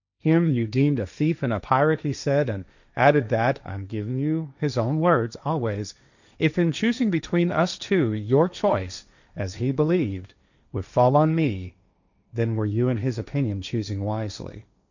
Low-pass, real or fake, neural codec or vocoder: 7.2 kHz; fake; codec, 16 kHz, 1.1 kbps, Voila-Tokenizer